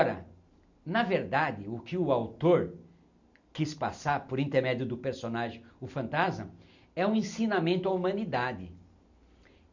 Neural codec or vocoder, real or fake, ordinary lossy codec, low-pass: none; real; none; 7.2 kHz